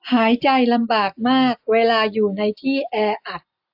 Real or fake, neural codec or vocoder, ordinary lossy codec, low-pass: real; none; AAC, 48 kbps; 5.4 kHz